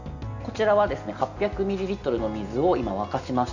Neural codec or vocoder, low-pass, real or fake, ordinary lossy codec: none; 7.2 kHz; real; none